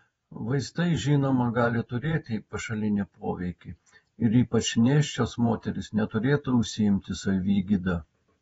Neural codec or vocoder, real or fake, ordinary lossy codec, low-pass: vocoder, 48 kHz, 128 mel bands, Vocos; fake; AAC, 24 kbps; 19.8 kHz